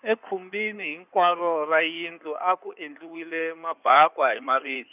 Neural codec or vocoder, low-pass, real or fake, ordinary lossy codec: codec, 16 kHz in and 24 kHz out, 2.2 kbps, FireRedTTS-2 codec; 3.6 kHz; fake; none